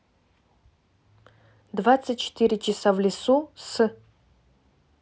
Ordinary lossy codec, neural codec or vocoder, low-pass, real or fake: none; none; none; real